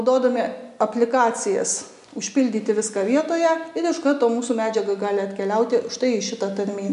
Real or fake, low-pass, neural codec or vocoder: real; 10.8 kHz; none